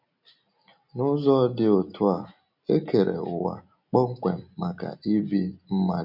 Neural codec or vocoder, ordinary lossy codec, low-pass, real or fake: none; none; 5.4 kHz; real